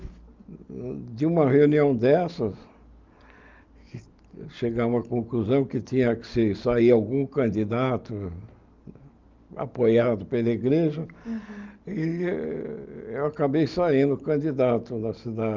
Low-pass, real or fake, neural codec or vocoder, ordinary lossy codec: 7.2 kHz; real; none; Opus, 24 kbps